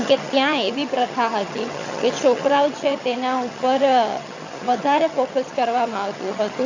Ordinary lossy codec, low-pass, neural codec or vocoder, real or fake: AAC, 48 kbps; 7.2 kHz; vocoder, 22.05 kHz, 80 mel bands, HiFi-GAN; fake